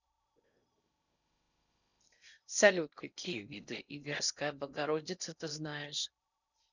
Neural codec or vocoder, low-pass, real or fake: codec, 16 kHz in and 24 kHz out, 0.6 kbps, FocalCodec, streaming, 4096 codes; 7.2 kHz; fake